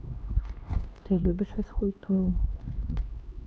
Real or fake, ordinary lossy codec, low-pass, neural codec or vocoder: fake; none; none; codec, 16 kHz, 2 kbps, X-Codec, HuBERT features, trained on LibriSpeech